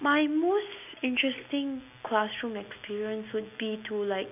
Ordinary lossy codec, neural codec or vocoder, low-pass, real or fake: none; none; 3.6 kHz; real